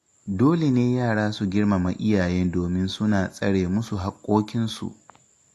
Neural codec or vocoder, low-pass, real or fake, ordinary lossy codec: none; 14.4 kHz; real; AAC, 64 kbps